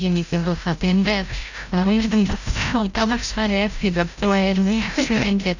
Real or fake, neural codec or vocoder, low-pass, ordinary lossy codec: fake; codec, 16 kHz, 0.5 kbps, FreqCodec, larger model; 7.2 kHz; none